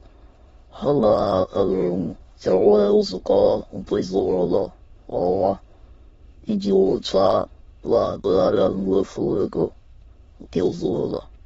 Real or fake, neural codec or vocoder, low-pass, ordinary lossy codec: fake; autoencoder, 22.05 kHz, a latent of 192 numbers a frame, VITS, trained on many speakers; 9.9 kHz; AAC, 24 kbps